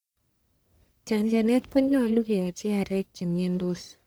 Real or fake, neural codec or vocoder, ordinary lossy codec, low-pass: fake; codec, 44.1 kHz, 1.7 kbps, Pupu-Codec; none; none